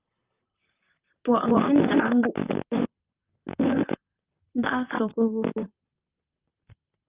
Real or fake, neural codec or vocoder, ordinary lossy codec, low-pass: fake; vocoder, 44.1 kHz, 128 mel bands, Pupu-Vocoder; Opus, 24 kbps; 3.6 kHz